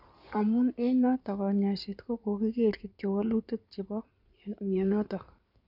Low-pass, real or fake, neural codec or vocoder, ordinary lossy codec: 5.4 kHz; fake; codec, 16 kHz in and 24 kHz out, 2.2 kbps, FireRedTTS-2 codec; none